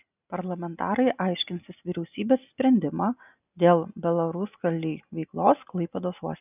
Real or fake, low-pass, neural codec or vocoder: real; 3.6 kHz; none